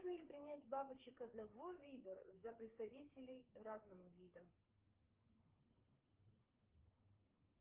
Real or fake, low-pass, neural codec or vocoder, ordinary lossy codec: fake; 3.6 kHz; codec, 16 kHz, 4 kbps, FreqCodec, larger model; Opus, 16 kbps